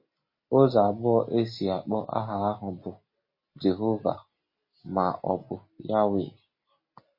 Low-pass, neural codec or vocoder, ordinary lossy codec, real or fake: 5.4 kHz; none; MP3, 24 kbps; real